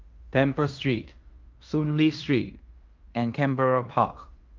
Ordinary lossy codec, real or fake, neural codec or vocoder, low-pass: Opus, 24 kbps; fake; codec, 16 kHz in and 24 kHz out, 0.9 kbps, LongCat-Audio-Codec, fine tuned four codebook decoder; 7.2 kHz